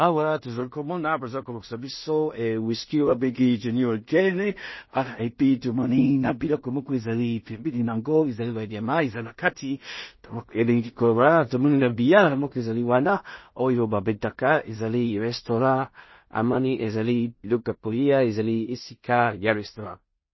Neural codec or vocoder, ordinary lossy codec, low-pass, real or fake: codec, 16 kHz in and 24 kHz out, 0.4 kbps, LongCat-Audio-Codec, two codebook decoder; MP3, 24 kbps; 7.2 kHz; fake